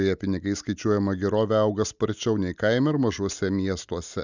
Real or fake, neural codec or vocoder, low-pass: real; none; 7.2 kHz